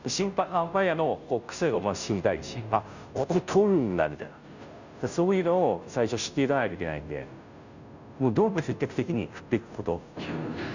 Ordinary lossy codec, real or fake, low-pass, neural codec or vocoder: none; fake; 7.2 kHz; codec, 16 kHz, 0.5 kbps, FunCodec, trained on Chinese and English, 25 frames a second